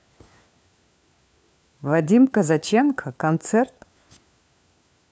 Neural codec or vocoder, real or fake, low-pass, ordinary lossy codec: codec, 16 kHz, 4 kbps, FunCodec, trained on LibriTTS, 50 frames a second; fake; none; none